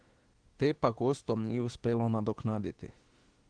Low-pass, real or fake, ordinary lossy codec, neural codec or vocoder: 9.9 kHz; fake; Opus, 16 kbps; autoencoder, 48 kHz, 32 numbers a frame, DAC-VAE, trained on Japanese speech